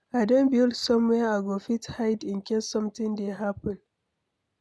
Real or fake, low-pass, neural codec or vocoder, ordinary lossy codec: real; none; none; none